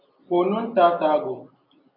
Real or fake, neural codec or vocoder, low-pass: real; none; 5.4 kHz